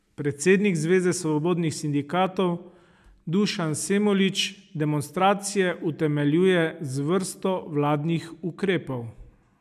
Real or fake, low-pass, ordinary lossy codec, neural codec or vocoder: real; 14.4 kHz; none; none